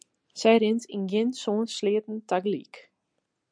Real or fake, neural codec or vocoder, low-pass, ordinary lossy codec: real; none; 9.9 kHz; MP3, 96 kbps